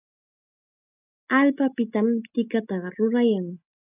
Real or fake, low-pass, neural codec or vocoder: real; 3.6 kHz; none